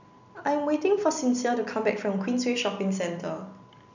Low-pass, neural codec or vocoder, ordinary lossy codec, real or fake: 7.2 kHz; none; none; real